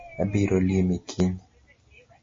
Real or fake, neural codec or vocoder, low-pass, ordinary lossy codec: real; none; 7.2 kHz; MP3, 32 kbps